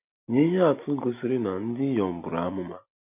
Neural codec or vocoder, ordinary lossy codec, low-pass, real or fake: none; none; 3.6 kHz; real